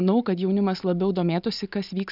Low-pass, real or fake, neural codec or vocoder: 5.4 kHz; real; none